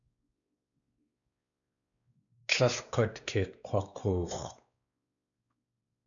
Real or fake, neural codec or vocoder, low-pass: fake; codec, 16 kHz, 2 kbps, X-Codec, WavLM features, trained on Multilingual LibriSpeech; 7.2 kHz